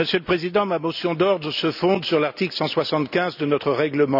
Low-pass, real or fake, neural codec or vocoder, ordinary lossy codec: 5.4 kHz; real; none; none